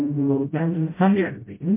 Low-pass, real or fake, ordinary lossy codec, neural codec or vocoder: 3.6 kHz; fake; MP3, 24 kbps; codec, 16 kHz, 0.5 kbps, FreqCodec, smaller model